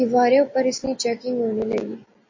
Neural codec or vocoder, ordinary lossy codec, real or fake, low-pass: none; MP3, 32 kbps; real; 7.2 kHz